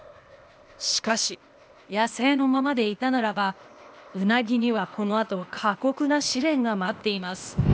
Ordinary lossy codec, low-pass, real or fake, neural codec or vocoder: none; none; fake; codec, 16 kHz, 0.8 kbps, ZipCodec